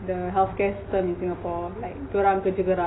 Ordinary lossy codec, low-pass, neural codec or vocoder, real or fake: AAC, 16 kbps; 7.2 kHz; none; real